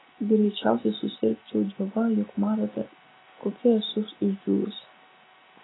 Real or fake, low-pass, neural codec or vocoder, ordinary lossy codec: real; 7.2 kHz; none; AAC, 16 kbps